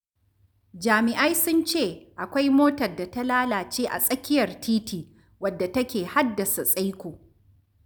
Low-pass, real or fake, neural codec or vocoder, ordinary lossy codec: none; real; none; none